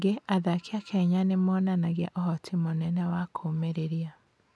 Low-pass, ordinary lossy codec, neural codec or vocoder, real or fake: none; none; none; real